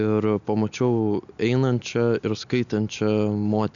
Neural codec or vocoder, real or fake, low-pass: none; real; 7.2 kHz